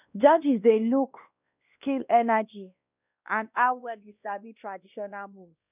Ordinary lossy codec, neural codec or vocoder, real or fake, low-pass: none; codec, 16 kHz, 1 kbps, X-Codec, WavLM features, trained on Multilingual LibriSpeech; fake; 3.6 kHz